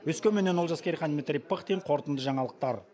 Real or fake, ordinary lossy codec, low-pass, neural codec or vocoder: real; none; none; none